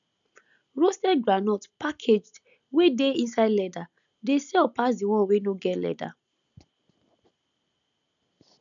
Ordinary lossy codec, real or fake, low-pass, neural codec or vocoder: none; real; 7.2 kHz; none